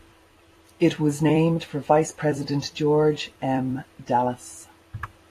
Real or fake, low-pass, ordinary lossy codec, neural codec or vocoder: fake; 14.4 kHz; AAC, 48 kbps; vocoder, 44.1 kHz, 128 mel bands every 256 samples, BigVGAN v2